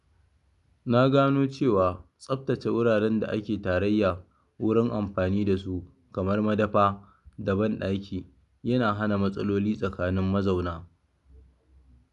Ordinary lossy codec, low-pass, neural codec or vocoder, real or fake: none; 10.8 kHz; none; real